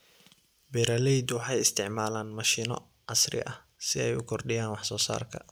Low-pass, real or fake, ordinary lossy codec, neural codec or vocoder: none; real; none; none